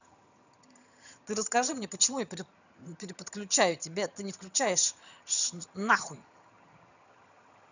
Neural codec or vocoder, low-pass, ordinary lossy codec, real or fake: vocoder, 22.05 kHz, 80 mel bands, HiFi-GAN; 7.2 kHz; none; fake